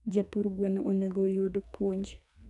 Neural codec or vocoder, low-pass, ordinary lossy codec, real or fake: codec, 44.1 kHz, 2.6 kbps, SNAC; 10.8 kHz; none; fake